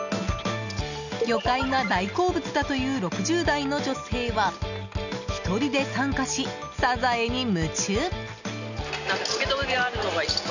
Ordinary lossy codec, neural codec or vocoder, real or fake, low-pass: none; none; real; 7.2 kHz